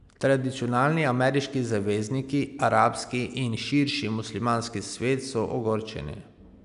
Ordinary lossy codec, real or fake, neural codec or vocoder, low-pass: none; real; none; 10.8 kHz